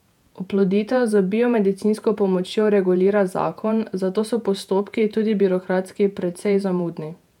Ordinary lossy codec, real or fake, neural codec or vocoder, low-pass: none; fake; vocoder, 48 kHz, 128 mel bands, Vocos; 19.8 kHz